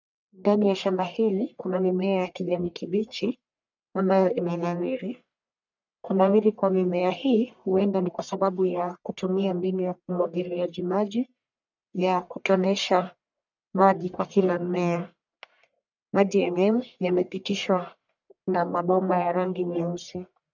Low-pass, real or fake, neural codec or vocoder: 7.2 kHz; fake; codec, 44.1 kHz, 1.7 kbps, Pupu-Codec